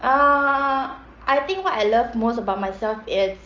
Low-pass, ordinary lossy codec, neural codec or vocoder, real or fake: 7.2 kHz; Opus, 24 kbps; none; real